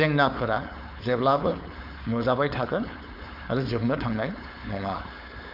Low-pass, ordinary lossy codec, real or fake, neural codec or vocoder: 5.4 kHz; none; fake; codec, 16 kHz, 4.8 kbps, FACodec